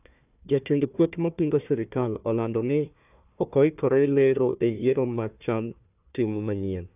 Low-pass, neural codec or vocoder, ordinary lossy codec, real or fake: 3.6 kHz; codec, 16 kHz, 1 kbps, FunCodec, trained on Chinese and English, 50 frames a second; none; fake